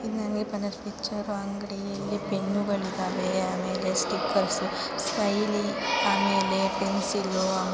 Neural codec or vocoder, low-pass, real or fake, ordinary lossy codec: none; none; real; none